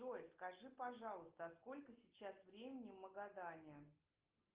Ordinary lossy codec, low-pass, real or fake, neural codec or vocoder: Opus, 32 kbps; 3.6 kHz; real; none